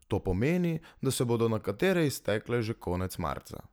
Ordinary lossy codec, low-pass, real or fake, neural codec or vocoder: none; none; real; none